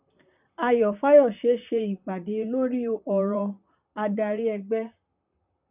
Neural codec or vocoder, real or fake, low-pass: vocoder, 44.1 kHz, 128 mel bands, Pupu-Vocoder; fake; 3.6 kHz